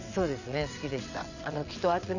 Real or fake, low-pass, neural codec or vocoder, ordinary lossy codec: fake; 7.2 kHz; vocoder, 22.05 kHz, 80 mel bands, WaveNeXt; none